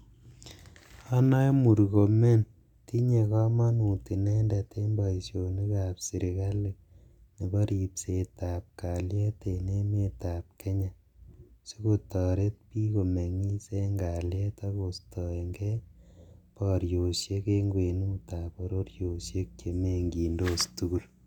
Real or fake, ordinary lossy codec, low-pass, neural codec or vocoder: real; none; 19.8 kHz; none